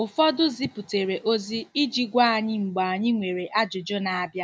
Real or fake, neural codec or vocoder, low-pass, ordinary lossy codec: real; none; none; none